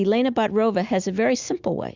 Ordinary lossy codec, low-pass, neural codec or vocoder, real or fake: Opus, 64 kbps; 7.2 kHz; none; real